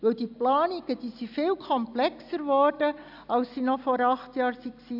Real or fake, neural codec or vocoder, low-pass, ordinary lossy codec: real; none; 5.4 kHz; none